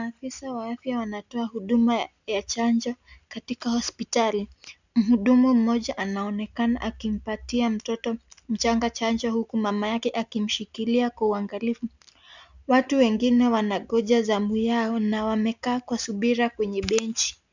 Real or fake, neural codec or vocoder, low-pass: real; none; 7.2 kHz